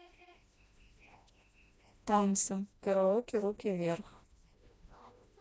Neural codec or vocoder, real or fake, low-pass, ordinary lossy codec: codec, 16 kHz, 1 kbps, FreqCodec, smaller model; fake; none; none